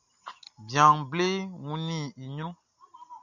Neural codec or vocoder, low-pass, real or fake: none; 7.2 kHz; real